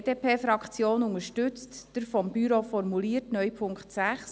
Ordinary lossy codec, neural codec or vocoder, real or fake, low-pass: none; none; real; none